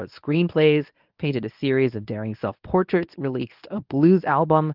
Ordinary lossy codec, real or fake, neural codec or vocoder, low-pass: Opus, 24 kbps; fake; codec, 24 kHz, 0.9 kbps, WavTokenizer, medium speech release version 1; 5.4 kHz